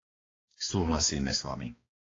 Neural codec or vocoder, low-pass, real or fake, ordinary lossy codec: codec, 16 kHz, 2 kbps, X-Codec, HuBERT features, trained on balanced general audio; 7.2 kHz; fake; AAC, 32 kbps